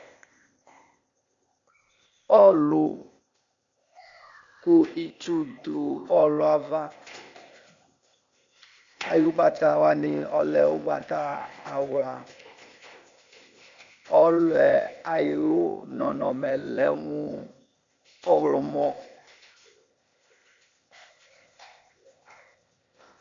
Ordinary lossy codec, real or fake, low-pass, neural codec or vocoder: MP3, 64 kbps; fake; 7.2 kHz; codec, 16 kHz, 0.8 kbps, ZipCodec